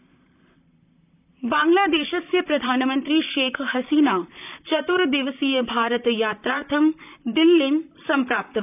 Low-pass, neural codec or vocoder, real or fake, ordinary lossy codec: 3.6 kHz; vocoder, 44.1 kHz, 128 mel bands, Pupu-Vocoder; fake; none